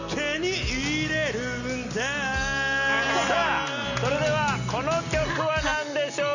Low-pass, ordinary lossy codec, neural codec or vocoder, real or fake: 7.2 kHz; none; none; real